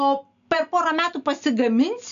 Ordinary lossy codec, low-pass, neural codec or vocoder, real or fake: AAC, 96 kbps; 7.2 kHz; none; real